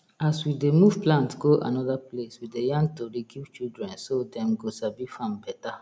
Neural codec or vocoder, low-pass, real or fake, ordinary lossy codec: none; none; real; none